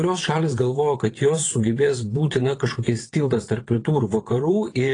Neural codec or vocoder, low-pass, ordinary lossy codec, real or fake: vocoder, 22.05 kHz, 80 mel bands, WaveNeXt; 9.9 kHz; AAC, 32 kbps; fake